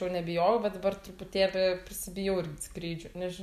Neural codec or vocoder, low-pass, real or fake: none; 14.4 kHz; real